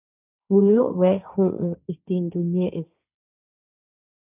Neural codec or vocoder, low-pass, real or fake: codec, 16 kHz, 1.1 kbps, Voila-Tokenizer; 3.6 kHz; fake